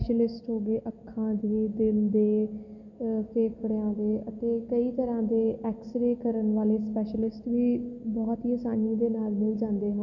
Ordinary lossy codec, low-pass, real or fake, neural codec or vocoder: none; 7.2 kHz; real; none